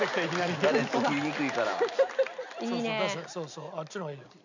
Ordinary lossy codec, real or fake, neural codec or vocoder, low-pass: none; real; none; 7.2 kHz